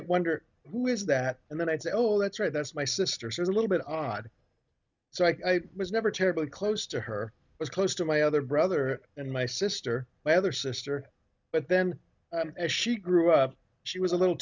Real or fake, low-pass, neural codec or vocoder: real; 7.2 kHz; none